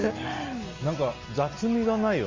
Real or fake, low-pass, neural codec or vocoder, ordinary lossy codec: real; 7.2 kHz; none; Opus, 32 kbps